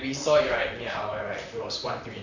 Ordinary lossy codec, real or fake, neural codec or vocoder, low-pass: none; fake; vocoder, 44.1 kHz, 128 mel bands, Pupu-Vocoder; 7.2 kHz